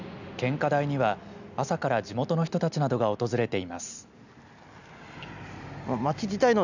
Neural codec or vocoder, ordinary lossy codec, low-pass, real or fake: none; none; 7.2 kHz; real